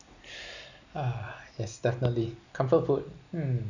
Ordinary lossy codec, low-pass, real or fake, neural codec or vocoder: none; 7.2 kHz; real; none